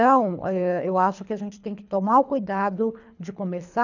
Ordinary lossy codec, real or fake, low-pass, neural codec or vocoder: none; fake; 7.2 kHz; codec, 24 kHz, 3 kbps, HILCodec